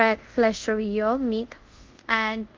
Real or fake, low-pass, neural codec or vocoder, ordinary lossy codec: fake; 7.2 kHz; codec, 24 kHz, 0.5 kbps, DualCodec; Opus, 32 kbps